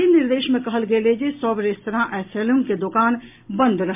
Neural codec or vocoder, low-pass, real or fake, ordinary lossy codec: none; 3.6 kHz; real; MP3, 32 kbps